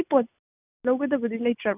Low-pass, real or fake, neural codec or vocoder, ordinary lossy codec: 3.6 kHz; real; none; none